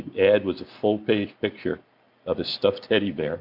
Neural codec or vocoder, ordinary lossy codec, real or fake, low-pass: none; MP3, 48 kbps; real; 5.4 kHz